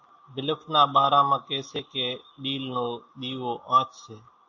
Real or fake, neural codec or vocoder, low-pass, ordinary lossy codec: real; none; 7.2 kHz; AAC, 48 kbps